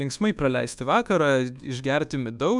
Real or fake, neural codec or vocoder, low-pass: fake; codec, 24 kHz, 1.2 kbps, DualCodec; 10.8 kHz